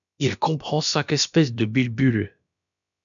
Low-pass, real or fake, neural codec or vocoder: 7.2 kHz; fake; codec, 16 kHz, about 1 kbps, DyCAST, with the encoder's durations